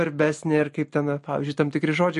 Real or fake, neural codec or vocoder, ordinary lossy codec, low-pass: real; none; MP3, 48 kbps; 14.4 kHz